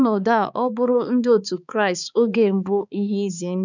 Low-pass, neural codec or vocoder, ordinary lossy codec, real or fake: 7.2 kHz; codec, 16 kHz, 4 kbps, X-Codec, HuBERT features, trained on balanced general audio; none; fake